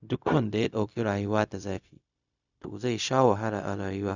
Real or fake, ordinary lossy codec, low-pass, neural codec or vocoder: fake; none; 7.2 kHz; codec, 16 kHz, 0.4 kbps, LongCat-Audio-Codec